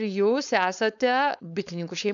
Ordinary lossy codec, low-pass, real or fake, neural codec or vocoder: MP3, 96 kbps; 7.2 kHz; fake; codec, 16 kHz, 4.8 kbps, FACodec